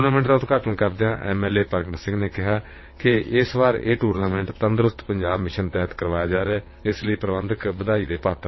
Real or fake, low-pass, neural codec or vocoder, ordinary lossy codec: fake; 7.2 kHz; vocoder, 22.05 kHz, 80 mel bands, WaveNeXt; MP3, 24 kbps